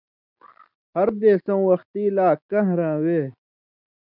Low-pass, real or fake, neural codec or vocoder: 5.4 kHz; real; none